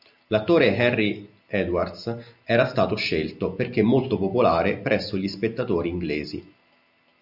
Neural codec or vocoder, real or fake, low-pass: none; real; 5.4 kHz